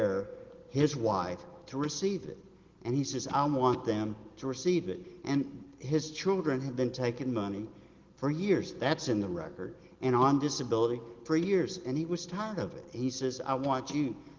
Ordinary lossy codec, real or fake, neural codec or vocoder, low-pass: Opus, 16 kbps; real; none; 7.2 kHz